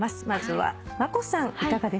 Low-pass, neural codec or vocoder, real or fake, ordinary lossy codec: none; none; real; none